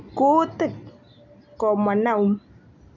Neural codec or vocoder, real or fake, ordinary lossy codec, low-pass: none; real; none; 7.2 kHz